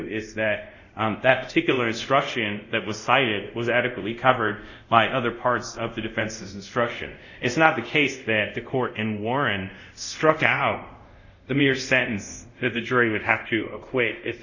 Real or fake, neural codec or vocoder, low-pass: fake; codec, 24 kHz, 0.5 kbps, DualCodec; 7.2 kHz